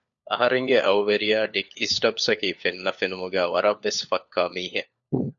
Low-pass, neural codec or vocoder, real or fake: 7.2 kHz; codec, 16 kHz, 4 kbps, FunCodec, trained on LibriTTS, 50 frames a second; fake